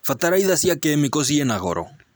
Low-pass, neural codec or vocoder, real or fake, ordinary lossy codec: none; none; real; none